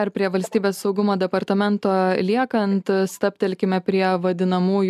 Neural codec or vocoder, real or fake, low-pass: none; real; 14.4 kHz